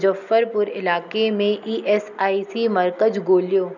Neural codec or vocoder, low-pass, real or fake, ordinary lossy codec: none; 7.2 kHz; real; none